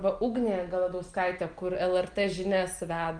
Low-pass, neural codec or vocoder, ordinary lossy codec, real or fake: 9.9 kHz; none; Opus, 24 kbps; real